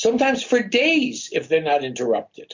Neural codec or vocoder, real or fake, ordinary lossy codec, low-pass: none; real; MP3, 48 kbps; 7.2 kHz